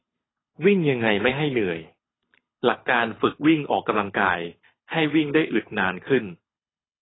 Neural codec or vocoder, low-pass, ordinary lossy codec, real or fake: codec, 24 kHz, 6 kbps, HILCodec; 7.2 kHz; AAC, 16 kbps; fake